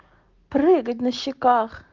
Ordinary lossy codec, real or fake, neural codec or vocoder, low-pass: Opus, 16 kbps; real; none; 7.2 kHz